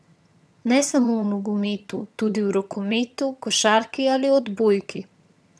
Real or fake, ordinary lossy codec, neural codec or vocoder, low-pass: fake; none; vocoder, 22.05 kHz, 80 mel bands, HiFi-GAN; none